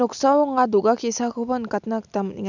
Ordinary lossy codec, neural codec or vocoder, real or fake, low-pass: none; none; real; 7.2 kHz